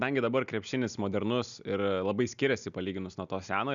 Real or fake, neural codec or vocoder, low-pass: real; none; 7.2 kHz